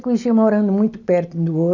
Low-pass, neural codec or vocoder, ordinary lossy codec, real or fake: 7.2 kHz; none; none; real